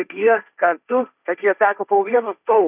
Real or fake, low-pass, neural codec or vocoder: fake; 3.6 kHz; codec, 16 kHz, 1.1 kbps, Voila-Tokenizer